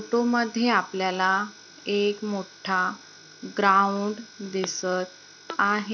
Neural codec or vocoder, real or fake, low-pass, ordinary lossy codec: none; real; none; none